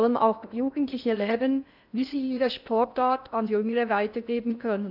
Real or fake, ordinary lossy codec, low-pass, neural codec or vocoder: fake; none; 5.4 kHz; codec, 16 kHz in and 24 kHz out, 0.6 kbps, FocalCodec, streaming, 2048 codes